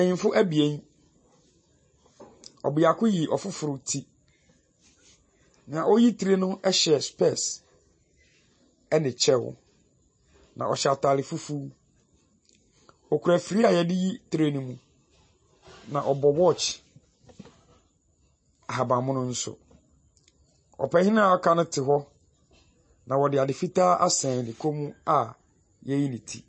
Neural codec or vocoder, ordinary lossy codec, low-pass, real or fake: none; MP3, 32 kbps; 9.9 kHz; real